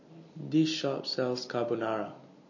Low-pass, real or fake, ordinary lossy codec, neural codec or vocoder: 7.2 kHz; real; MP3, 32 kbps; none